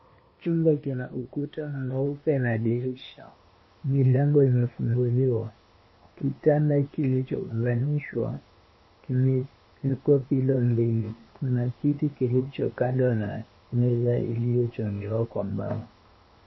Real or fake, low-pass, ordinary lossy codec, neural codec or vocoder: fake; 7.2 kHz; MP3, 24 kbps; codec, 16 kHz, 0.8 kbps, ZipCodec